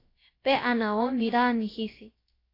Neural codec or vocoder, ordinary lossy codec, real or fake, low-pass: codec, 16 kHz, about 1 kbps, DyCAST, with the encoder's durations; AAC, 24 kbps; fake; 5.4 kHz